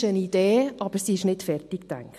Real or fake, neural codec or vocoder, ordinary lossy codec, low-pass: real; none; MP3, 64 kbps; 14.4 kHz